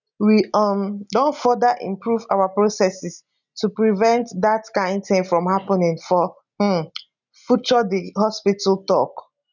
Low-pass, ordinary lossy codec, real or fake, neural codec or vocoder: 7.2 kHz; none; real; none